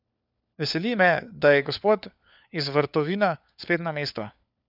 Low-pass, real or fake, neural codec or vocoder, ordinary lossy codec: 5.4 kHz; fake; codec, 16 kHz, 4 kbps, FunCodec, trained on LibriTTS, 50 frames a second; none